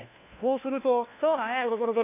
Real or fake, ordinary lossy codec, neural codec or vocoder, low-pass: fake; AAC, 24 kbps; codec, 16 kHz, 1 kbps, FunCodec, trained on LibriTTS, 50 frames a second; 3.6 kHz